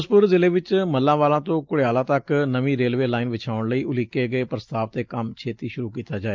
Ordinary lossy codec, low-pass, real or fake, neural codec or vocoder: Opus, 24 kbps; 7.2 kHz; real; none